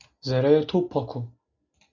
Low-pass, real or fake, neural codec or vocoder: 7.2 kHz; real; none